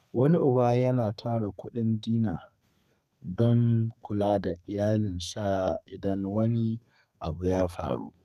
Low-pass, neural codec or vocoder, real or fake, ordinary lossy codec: 14.4 kHz; codec, 32 kHz, 1.9 kbps, SNAC; fake; none